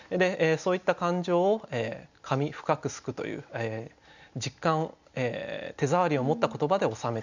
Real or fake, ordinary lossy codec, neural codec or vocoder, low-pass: real; none; none; 7.2 kHz